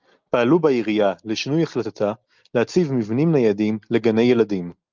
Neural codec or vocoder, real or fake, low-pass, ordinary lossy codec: none; real; 7.2 kHz; Opus, 32 kbps